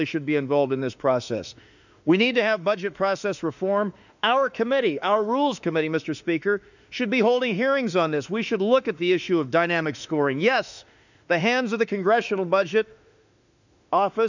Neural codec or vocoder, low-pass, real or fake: autoencoder, 48 kHz, 32 numbers a frame, DAC-VAE, trained on Japanese speech; 7.2 kHz; fake